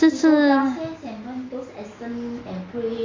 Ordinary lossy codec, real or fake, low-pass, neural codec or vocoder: AAC, 32 kbps; real; 7.2 kHz; none